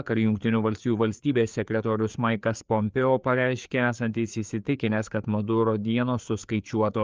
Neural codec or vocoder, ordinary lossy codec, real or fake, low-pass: codec, 16 kHz, 4 kbps, FreqCodec, larger model; Opus, 24 kbps; fake; 7.2 kHz